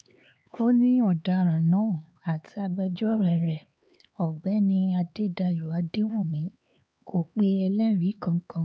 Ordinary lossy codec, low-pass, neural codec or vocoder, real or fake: none; none; codec, 16 kHz, 4 kbps, X-Codec, HuBERT features, trained on LibriSpeech; fake